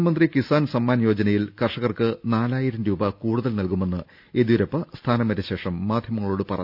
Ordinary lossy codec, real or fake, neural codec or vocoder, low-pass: none; real; none; 5.4 kHz